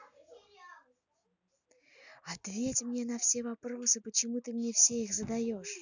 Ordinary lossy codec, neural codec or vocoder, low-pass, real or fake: none; none; 7.2 kHz; real